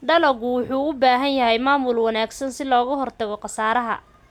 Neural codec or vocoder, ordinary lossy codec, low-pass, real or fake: none; none; 19.8 kHz; real